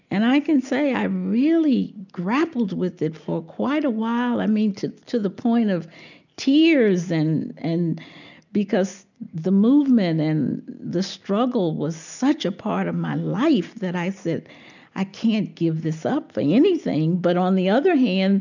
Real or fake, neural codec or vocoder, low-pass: real; none; 7.2 kHz